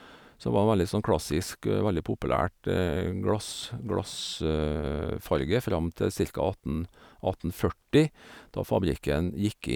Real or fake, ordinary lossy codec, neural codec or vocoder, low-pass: real; none; none; none